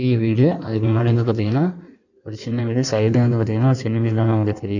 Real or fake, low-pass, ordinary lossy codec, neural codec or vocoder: fake; 7.2 kHz; none; codec, 16 kHz in and 24 kHz out, 1.1 kbps, FireRedTTS-2 codec